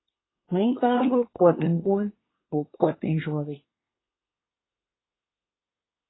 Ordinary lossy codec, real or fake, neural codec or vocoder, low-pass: AAC, 16 kbps; fake; codec, 24 kHz, 1 kbps, SNAC; 7.2 kHz